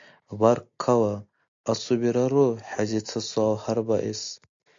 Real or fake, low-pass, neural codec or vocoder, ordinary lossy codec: real; 7.2 kHz; none; AAC, 48 kbps